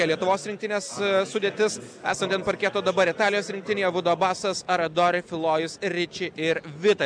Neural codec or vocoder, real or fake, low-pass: none; real; 9.9 kHz